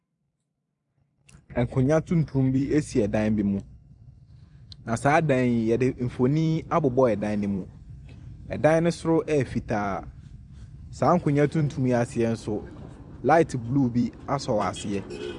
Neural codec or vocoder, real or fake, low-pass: vocoder, 44.1 kHz, 128 mel bands every 256 samples, BigVGAN v2; fake; 10.8 kHz